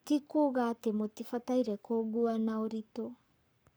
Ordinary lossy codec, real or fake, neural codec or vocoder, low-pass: none; fake; codec, 44.1 kHz, 7.8 kbps, Pupu-Codec; none